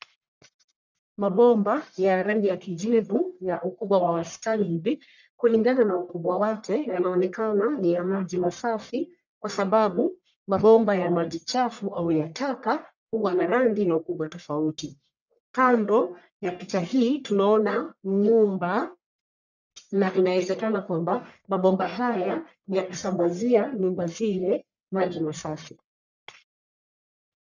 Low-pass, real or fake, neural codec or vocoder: 7.2 kHz; fake; codec, 44.1 kHz, 1.7 kbps, Pupu-Codec